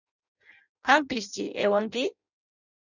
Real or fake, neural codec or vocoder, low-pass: fake; codec, 16 kHz in and 24 kHz out, 0.6 kbps, FireRedTTS-2 codec; 7.2 kHz